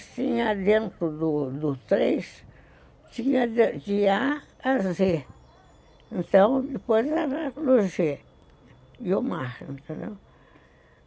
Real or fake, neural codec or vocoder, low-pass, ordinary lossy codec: real; none; none; none